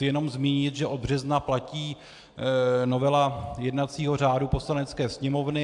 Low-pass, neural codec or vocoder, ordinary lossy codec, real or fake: 10.8 kHz; none; AAC, 64 kbps; real